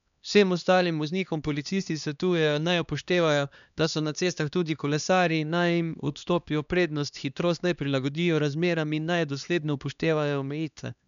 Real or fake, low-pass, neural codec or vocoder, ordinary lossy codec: fake; 7.2 kHz; codec, 16 kHz, 2 kbps, X-Codec, HuBERT features, trained on LibriSpeech; none